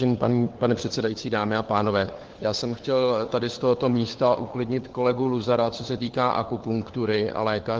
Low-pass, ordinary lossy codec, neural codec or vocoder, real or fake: 7.2 kHz; Opus, 16 kbps; codec, 16 kHz, 4 kbps, FunCodec, trained on LibriTTS, 50 frames a second; fake